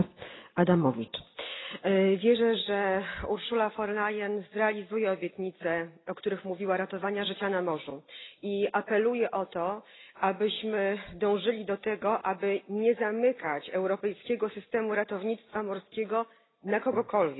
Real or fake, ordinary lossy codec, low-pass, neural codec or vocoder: real; AAC, 16 kbps; 7.2 kHz; none